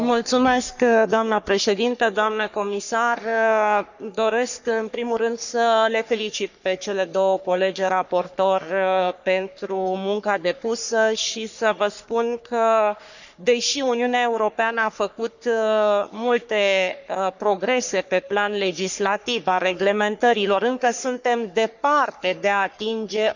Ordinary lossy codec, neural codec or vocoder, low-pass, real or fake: none; codec, 44.1 kHz, 3.4 kbps, Pupu-Codec; 7.2 kHz; fake